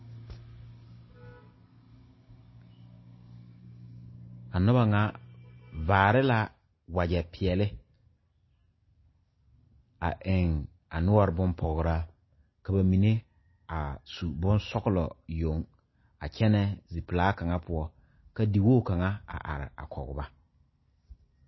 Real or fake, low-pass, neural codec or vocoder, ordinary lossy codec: real; 7.2 kHz; none; MP3, 24 kbps